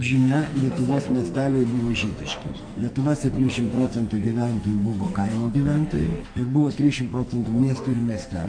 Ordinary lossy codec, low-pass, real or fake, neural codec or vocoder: MP3, 48 kbps; 9.9 kHz; fake; codec, 44.1 kHz, 2.6 kbps, SNAC